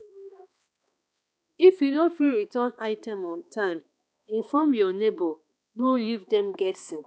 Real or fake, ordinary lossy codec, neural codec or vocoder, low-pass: fake; none; codec, 16 kHz, 2 kbps, X-Codec, HuBERT features, trained on balanced general audio; none